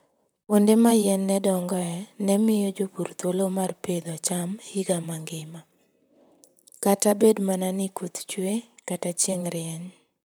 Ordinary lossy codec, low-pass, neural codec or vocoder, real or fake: none; none; vocoder, 44.1 kHz, 128 mel bands, Pupu-Vocoder; fake